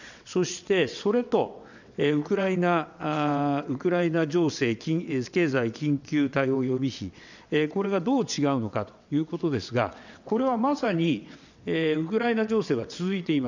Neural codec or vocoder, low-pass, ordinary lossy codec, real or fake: vocoder, 22.05 kHz, 80 mel bands, WaveNeXt; 7.2 kHz; none; fake